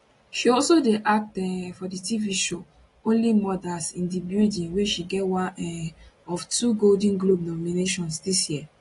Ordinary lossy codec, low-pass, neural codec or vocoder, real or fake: AAC, 32 kbps; 10.8 kHz; none; real